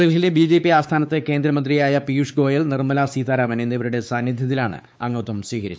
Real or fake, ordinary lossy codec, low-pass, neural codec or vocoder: fake; none; none; codec, 16 kHz, 4 kbps, X-Codec, WavLM features, trained on Multilingual LibriSpeech